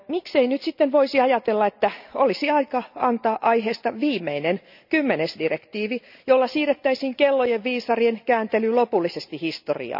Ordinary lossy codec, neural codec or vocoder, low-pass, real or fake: none; none; 5.4 kHz; real